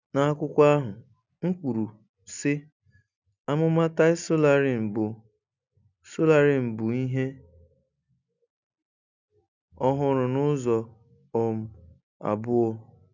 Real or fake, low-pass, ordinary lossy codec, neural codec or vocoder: real; 7.2 kHz; none; none